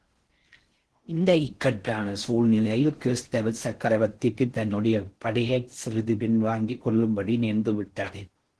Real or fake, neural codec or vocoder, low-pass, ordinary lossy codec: fake; codec, 16 kHz in and 24 kHz out, 0.6 kbps, FocalCodec, streaming, 4096 codes; 10.8 kHz; Opus, 16 kbps